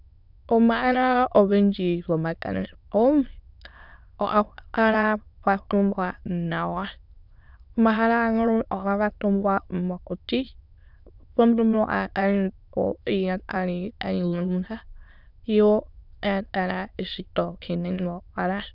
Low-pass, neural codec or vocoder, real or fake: 5.4 kHz; autoencoder, 22.05 kHz, a latent of 192 numbers a frame, VITS, trained on many speakers; fake